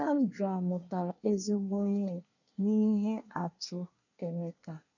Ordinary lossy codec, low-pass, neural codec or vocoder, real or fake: none; 7.2 kHz; codec, 24 kHz, 1 kbps, SNAC; fake